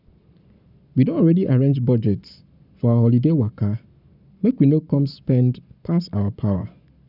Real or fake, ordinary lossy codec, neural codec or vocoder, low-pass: fake; none; codec, 44.1 kHz, 7.8 kbps, Pupu-Codec; 5.4 kHz